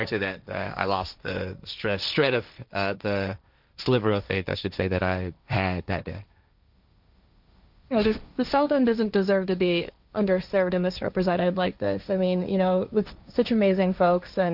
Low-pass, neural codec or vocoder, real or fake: 5.4 kHz; codec, 16 kHz, 1.1 kbps, Voila-Tokenizer; fake